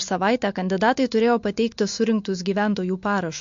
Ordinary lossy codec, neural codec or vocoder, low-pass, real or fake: MP3, 48 kbps; none; 7.2 kHz; real